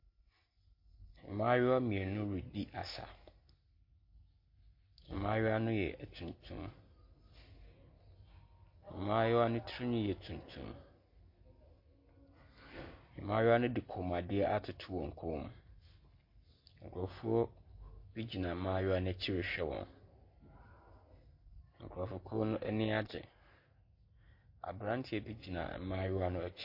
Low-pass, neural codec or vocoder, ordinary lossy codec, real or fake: 5.4 kHz; none; MP3, 32 kbps; real